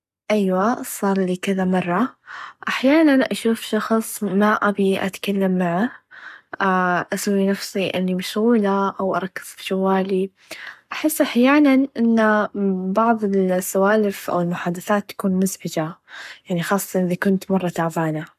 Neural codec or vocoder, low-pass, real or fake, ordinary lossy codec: codec, 44.1 kHz, 7.8 kbps, Pupu-Codec; 14.4 kHz; fake; none